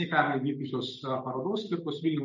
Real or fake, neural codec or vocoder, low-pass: real; none; 7.2 kHz